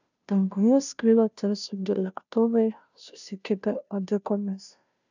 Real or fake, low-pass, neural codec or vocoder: fake; 7.2 kHz; codec, 16 kHz, 0.5 kbps, FunCodec, trained on Chinese and English, 25 frames a second